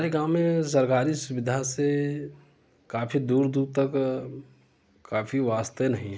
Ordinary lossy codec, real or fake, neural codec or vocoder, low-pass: none; real; none; none